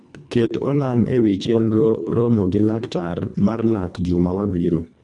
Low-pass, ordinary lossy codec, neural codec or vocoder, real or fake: 10.8 kHz; none; codec, 24 kHz, 1.5 kbps, HILCodec; fake